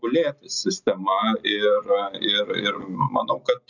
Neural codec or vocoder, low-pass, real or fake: none; 7.2 kHz; real